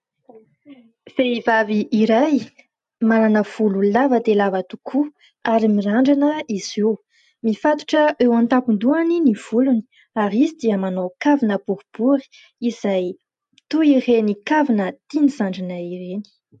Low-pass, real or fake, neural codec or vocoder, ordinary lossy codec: 10.8 kHz; real; none; AAC, 96 kbps